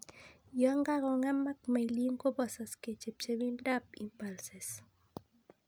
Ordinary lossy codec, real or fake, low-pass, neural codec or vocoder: none; real; none; none